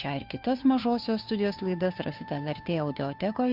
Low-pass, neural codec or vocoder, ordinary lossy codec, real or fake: 5.4 kHz; codec, 16 kHz, 2 kbps, FunCodec, trained on Chinese and English, 25 frames a second; AAC, 48 kbps; fake